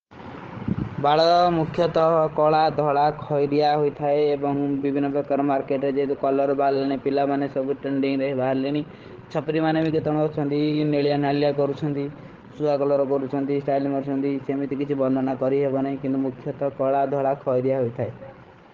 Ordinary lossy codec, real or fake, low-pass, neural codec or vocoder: Opus, 16 kbps; fake; 7.2 kHz; codec, 16 kHz, 16 kbps, FreqCodec, larger model